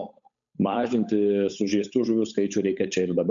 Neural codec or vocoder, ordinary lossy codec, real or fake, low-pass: codec, 16 kHz, 8 kbps, FunCodec, trained on Chinese and English, 25 frames a second; MP3, 64 kbps; fake; 7.2 kHz